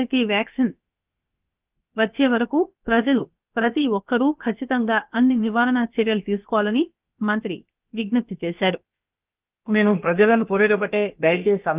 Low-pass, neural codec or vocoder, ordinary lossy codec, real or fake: 3.6 kHz; codec, 16 kHz, about 1 kbps, DyCAST, with the encoder's durations; Opus, 24 kbps; fake